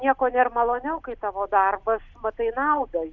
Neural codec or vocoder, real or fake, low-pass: none; real; 7.2 kHz